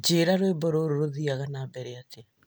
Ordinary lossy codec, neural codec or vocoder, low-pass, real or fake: none; none; none; real